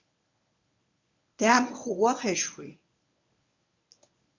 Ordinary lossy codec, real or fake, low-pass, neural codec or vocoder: MP3, 64 kbps; fake; 7.2 kHz; codec, 24 kHz, 0.9 kbps, WavTokenizer, medium speech release version 1